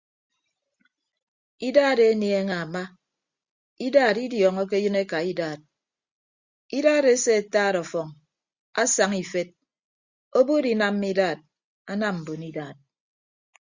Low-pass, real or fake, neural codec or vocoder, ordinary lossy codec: 7.2 kHz; real; none; Opus, 64 kbps